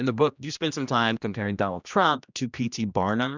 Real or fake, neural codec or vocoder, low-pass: fake; codec, 16 kHz, 1 kbps, X-Codec, HuBERT features, trained on general audio; 7.2 kHz